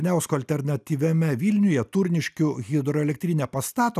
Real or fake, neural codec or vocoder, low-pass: real; none; 14.4 kHz